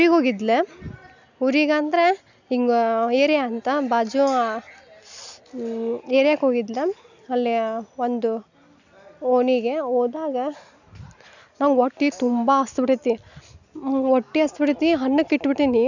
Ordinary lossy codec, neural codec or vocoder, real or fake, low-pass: none; none; real; 7.2 kHz